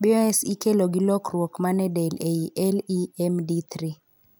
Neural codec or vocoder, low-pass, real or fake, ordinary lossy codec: none; none; real; none